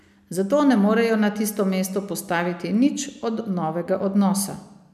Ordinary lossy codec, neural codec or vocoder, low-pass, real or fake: none; none; 14.4 kHz; real